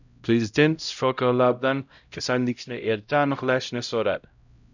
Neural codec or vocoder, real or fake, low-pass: codec, 16 kHz, 0.5 kbps, X-Codec, HuBERT features, trained on LibriSpeech; fake; 7.2 kHz